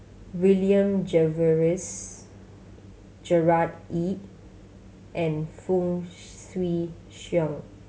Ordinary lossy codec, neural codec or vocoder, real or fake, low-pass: none; none; real; none